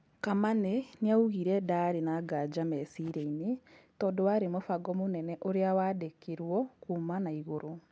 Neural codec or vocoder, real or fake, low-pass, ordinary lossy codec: none; real; none; none